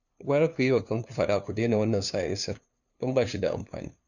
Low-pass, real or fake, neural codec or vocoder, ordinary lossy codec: 7.2 kHz; fake; codec, 16 kHz, 2 kbps, FunCodec, trained on LibriTTS, 25 frames a second; none